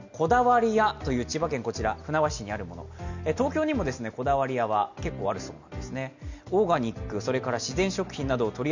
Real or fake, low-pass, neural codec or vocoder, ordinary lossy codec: real; 7.2 kHz; none; none